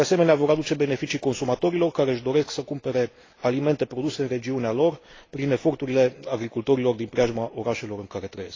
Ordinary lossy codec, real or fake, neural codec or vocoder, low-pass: AAC, 32 kbps; real; none; 7.2 kHz